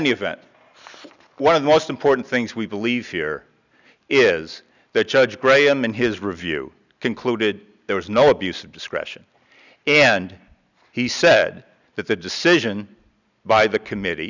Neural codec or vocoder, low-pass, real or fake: none; 7.2 kHz; real